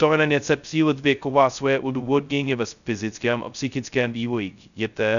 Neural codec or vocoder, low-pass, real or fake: codec, 16 kHz, 0.2 kbps, FocalCodec; 7.2 kHz; fake